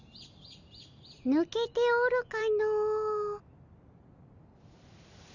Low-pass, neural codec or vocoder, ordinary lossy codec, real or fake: 7.2 kHz; none; none; real